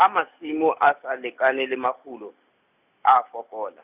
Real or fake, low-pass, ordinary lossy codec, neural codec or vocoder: real; 3.6 kHz; none; none